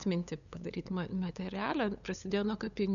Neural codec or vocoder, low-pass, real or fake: codec, 16 kHz, 8 kbps, FunCodec, trained on LibriTTS, 25 frames a second; 7.2 kHz; fake